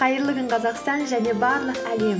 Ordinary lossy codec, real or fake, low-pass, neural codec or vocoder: none; real; none; none